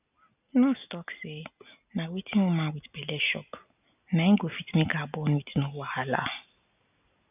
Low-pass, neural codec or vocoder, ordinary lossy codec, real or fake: 3.6 kHz; none; none; real